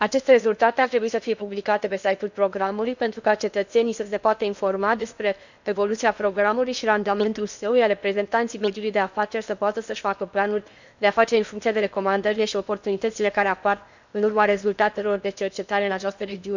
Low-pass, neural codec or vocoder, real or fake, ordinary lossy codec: 7.2 kHz; codec, 16 kHz in and 24 kHz out, 0.8 kbps, FocalCodec, streaming, 65536 codes; fake; none